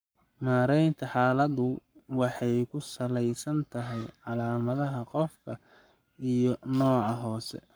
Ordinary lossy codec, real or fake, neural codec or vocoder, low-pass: none; fake; codec, 44.1 kHz, 7.8 kbps, Pupu-Codec; none